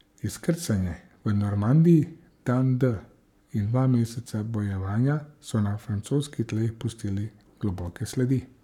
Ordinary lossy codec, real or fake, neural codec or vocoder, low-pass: none; fake; codec, 44.1 kHz, 7.8 kbps, Pupu-Codec; 19.8 kHz